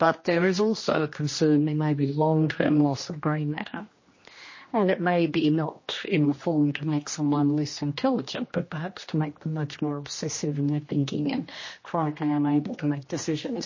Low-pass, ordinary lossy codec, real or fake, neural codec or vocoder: 7.2 kHz; MP3, 32 kbps; fake; codec, 16 kHz, 1 kbps, X-Codec, HuBERT features, trained on general audio